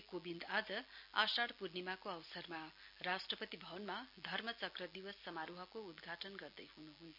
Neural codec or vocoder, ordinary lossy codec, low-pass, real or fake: none; none; 5.4 kHz; real